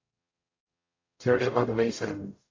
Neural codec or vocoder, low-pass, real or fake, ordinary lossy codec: codec, 44.1 kHz, 0.9 kbps, DAC; 7.2 kHz; fake; AAC, 32 kbps